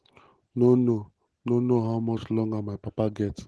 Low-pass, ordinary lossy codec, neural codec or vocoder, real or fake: 10.8 kHz; Opus, 16 kbps; none; real